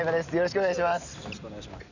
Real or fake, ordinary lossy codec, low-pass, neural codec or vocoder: real; none; 7.2 kHz; none